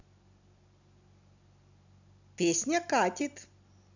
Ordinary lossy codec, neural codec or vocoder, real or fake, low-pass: none; none; real; 7.2 kHz